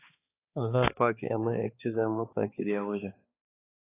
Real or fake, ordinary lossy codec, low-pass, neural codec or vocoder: fake; AAC, 16 kbps; 3.6 kHz; codec, 16 kHz, 2 kbps, X-Codec, HuBERT features, trained on balanced general audio